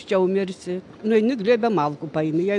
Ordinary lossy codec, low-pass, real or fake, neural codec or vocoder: AAC, 64 kbps; 10.8 kHz; real; none